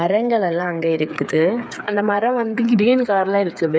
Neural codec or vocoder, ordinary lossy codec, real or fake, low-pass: codec, 16 kHz, 8 kbps, FreqCodec, smaller model; none; fake; none